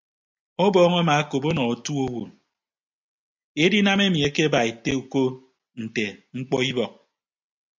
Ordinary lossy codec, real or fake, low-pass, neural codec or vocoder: MP3, 64 kbps; real; 7.2 kHz; none